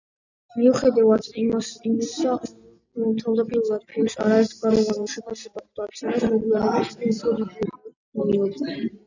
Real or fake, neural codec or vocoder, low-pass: real; none; 7.2 kHz